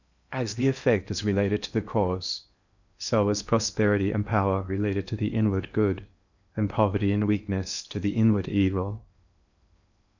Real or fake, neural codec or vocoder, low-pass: fake; codec, 16 kHz in and 24 kHz out, 0.8 kbps, FocalCodec, streaming, 65536 codes; 7.2 kHz